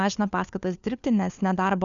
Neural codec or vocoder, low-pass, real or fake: codec, 16 kHz, 4 kbps, FunCodec, trained on LibriTTS, 50 frames a second; 7.2 kHz; fake